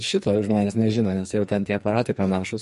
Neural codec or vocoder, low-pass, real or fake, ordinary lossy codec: codec, 44.1 kHz, 2.6 kbps, SNAC; 14.4 kHz; fake; MP3, 48 kbps